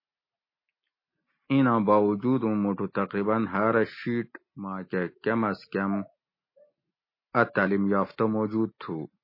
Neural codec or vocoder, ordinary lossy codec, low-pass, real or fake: none; MP3, 24 kbps; 5.4 kHz; real